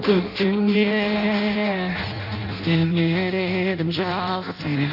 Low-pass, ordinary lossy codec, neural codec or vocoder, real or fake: 5.4 kHz; none; codec, 16 kHz in and 24 kHz out, 0.6 kbps, FireRedTTS-2 codec; fake